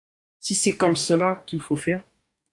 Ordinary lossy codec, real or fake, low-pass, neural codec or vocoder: AAC, 64 kbps; fake; 10.8 kHz; codec, 44.1 kHz, 2.6 kbps, DAC